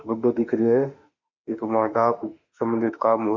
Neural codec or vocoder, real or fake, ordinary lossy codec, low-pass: autoencoder, 48 kHz, 32 numbers a frame, DAC-VAE, trained on Japanese speech; fake; Opus, 64 kbps; 7.2 kHz